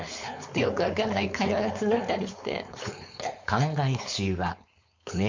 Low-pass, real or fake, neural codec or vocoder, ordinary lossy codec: 7.2 kHz; fake; codec, 16 kHz, 4.8 kbps, FACodec; MP3, 48 kbps